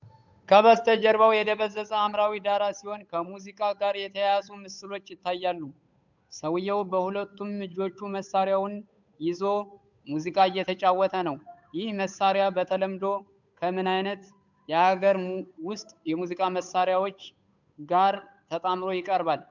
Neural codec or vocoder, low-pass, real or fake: codec, 16 kHz, 8 kbps, FunCodec, trained on Chinese and English, 25 frames a second; 7.2 kHz; fake